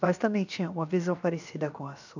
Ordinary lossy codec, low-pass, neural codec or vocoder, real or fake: none; 7.2 kHz; codec, 16 kHz, 0.7 kbps, FocalCodec; fake